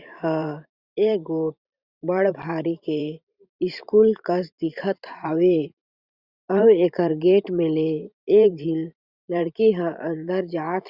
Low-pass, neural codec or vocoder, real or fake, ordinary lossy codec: 5.4 kHz; vocoder, 44.1 kHz, 128 mel bands every 512 samples, BigVGAN v2; fake; Opus, 64 kbps